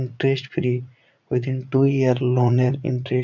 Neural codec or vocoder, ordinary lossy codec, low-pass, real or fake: vocoder, 44.1 kHz, 80 mel bands, Vocos; none; 7.2 kHz; fake